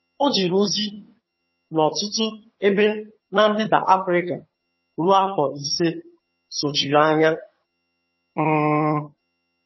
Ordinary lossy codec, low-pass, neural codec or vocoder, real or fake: MP3, 24 kbps; 7.2 kHz; vocoder, 22.05 kHz, 80 mel bands, HiFi-GAN; fake